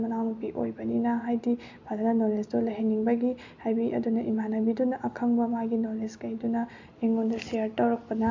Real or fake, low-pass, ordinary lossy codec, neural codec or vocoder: real; 7.2 kHz; AAC, 48 kbps; none